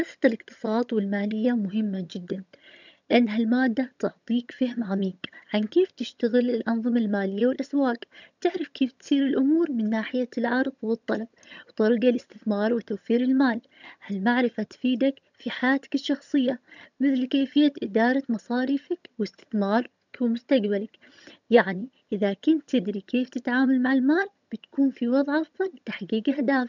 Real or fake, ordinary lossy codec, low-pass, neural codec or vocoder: fake; none; 7.2 kHz; vocoder, 22.05 kHz, 80 mel bands, HiFi-GAN